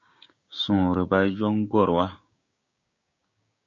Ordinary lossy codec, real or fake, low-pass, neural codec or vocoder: MP3, 48 kbps; fake; 7.2 kHz; codec, 16 kHz, 6 kbps, DAC